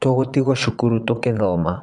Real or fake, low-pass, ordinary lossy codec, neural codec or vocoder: fake; 9.9 kHz; none; vocoder, 22.05 kHz, 80 mel bands, Vocos